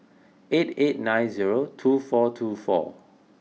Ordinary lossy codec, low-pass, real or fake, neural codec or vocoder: none; none; real; none